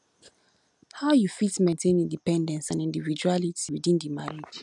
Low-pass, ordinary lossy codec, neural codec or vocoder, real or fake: 10.8 kHz; none; none; real